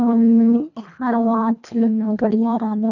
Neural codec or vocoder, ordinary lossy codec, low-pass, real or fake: codec, 24 kHz, 1.5 kbps, HILCodec; none; 7.2 kHz; fake